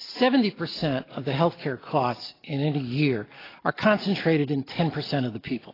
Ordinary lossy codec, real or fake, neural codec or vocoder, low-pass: AAC, 24 kbps; real; none; 5.4 kHz